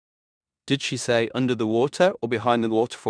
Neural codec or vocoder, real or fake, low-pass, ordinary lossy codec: codec, 16 kHz in and 24 kHz out, 0.9 kbps, LongCat-Audio-Codec, four codebook decoder; fake; 9.9 kHz; none